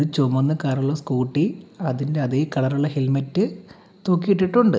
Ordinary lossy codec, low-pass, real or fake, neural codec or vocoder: none; none; real; none